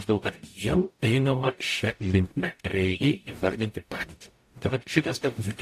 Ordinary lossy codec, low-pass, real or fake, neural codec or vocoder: AAC, 64 kbps; 14.4 kHz; fake; codec, 44.1 kHz, 0.9 kbps, DAC